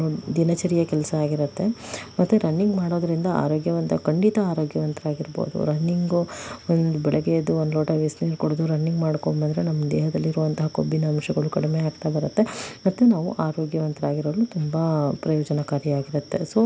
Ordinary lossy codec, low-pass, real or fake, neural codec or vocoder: none; none; real; none